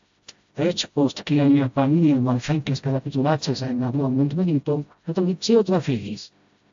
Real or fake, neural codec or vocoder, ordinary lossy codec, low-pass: fake; codec, 16 kHz, 0.5 kbps, FreqCodec, smaller model; AAC, 64 kbps; 7.2 kHz